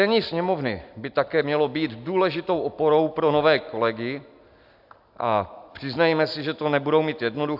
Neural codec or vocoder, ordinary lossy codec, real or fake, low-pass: none; AAC, 48 kbps; real; 5.4 kHz